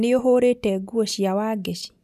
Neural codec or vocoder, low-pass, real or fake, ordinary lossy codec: none; 14.4 kHz; real; none